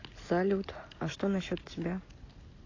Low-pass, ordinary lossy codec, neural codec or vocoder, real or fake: 7.2 kHz; AAC, 32 kbps; none; real